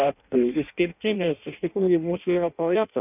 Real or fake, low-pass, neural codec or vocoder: fake; 3.6 kHz; codec, 16 kHz in and 24 kHz out, 0.6 kbps, FireRedTTS-2 codec